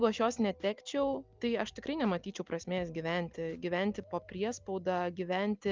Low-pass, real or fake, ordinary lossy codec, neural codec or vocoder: 7.2 kHz; real; Opus, 24 kbps; none